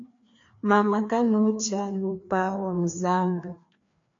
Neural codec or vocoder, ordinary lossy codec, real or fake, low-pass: codec, 16 kHz, 2 kbps, FreqCodec, larger model; AAC, 48 kbps; fake; 7.2 kHz